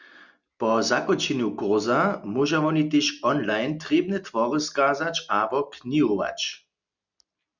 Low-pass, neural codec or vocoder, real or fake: 7.2 kHz; none; real